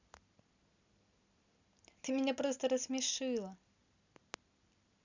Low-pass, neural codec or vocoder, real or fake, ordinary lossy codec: 7.2 kHz; none; real; none